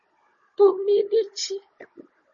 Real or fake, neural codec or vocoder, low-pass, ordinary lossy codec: fake; codec, 16 kHz, 16 kbps, FunCodec, trained on Chinese and English, 50 frames a second; 7.2 kHz; MP3, 32 kbps